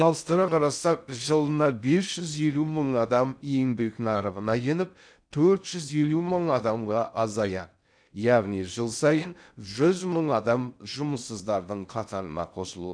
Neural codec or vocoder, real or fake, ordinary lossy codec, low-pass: codec, 16 kHz in and 24 kHz out, 0.6 kbps, FocalCodec, streaming, 2048 codes; fake; none; 9.9 kHz